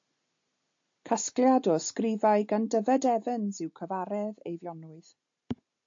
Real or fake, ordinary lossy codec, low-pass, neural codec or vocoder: real; AAC, 48 kbps; 7.2 kHz; none